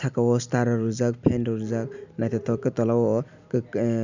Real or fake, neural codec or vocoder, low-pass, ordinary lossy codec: real; none; 7.2 kHz; none